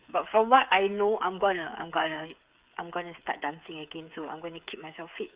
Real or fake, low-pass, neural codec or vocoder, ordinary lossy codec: fake; 3.6 kHz; codec, 16 kHz, 8 kbps, FreqCodec, smaller model; Opus, 64 kbps